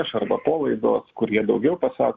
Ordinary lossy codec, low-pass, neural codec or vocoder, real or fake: Opus, 64 kbps; 7.2 kHz; none; real